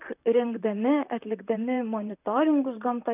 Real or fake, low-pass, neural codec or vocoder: fake; 3.6 kHz; vocoder, 44.1 kHz, 128 mel bands, Pupu-Vocoder